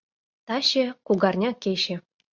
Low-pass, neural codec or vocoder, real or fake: 7.2 kHz; none; real